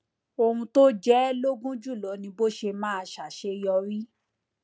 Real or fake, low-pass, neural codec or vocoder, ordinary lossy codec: real; none; none; none